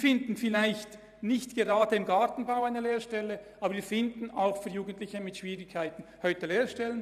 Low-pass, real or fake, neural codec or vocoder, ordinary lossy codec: 14.4 kHz; fake; vocoder, 44.1 kHz, 128 mel bands every 512 samples, BigVGAN v2; none